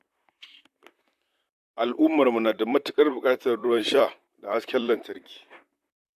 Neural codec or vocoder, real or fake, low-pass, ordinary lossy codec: vocoder, 44.1 kHz, 128 mel bands, Pupu-Vocoder; fake; 14.4 kHz; AAC, 96 kbps